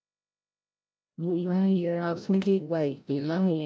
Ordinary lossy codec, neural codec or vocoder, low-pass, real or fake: none; codec, 16 kHz, 0.5 kbps, FreqCodec, larger model; none; fake